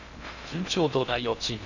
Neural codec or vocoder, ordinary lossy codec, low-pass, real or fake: codec, 16 kHz in and 24 kHz out, 0.6 kbps, FocalCodec, streaming, 2048 codes; none; 7.2 kHz; fake